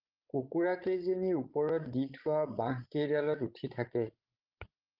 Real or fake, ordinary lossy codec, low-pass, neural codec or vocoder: fake; Opus, 24 kbps; 5.4 kHz; codec, 16 kHz, 16 kbps, FreqCodec, larger model